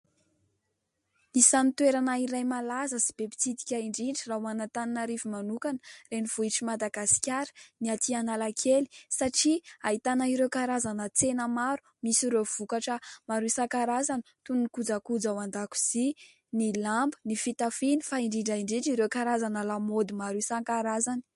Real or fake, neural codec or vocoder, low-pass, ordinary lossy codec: real; none; 14.4 kHz; MP3, 48 kbps